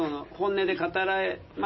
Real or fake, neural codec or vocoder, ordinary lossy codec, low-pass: real; none; MP3, 24 kbps; 7.2 kHz